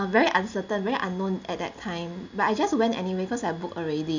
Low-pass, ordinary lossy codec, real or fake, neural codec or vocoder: 7.2 kHz; none; real; none